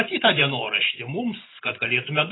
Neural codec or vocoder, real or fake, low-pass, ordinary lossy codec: none; real; 7.2 kHz; AAC, 16 kbps